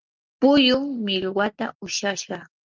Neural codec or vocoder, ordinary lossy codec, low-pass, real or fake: none; Opus, 24 kbps; 7.2 kHz; real